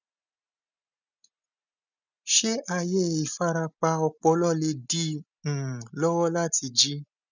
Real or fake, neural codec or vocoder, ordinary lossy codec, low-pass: real; none; none; 7.2 kHz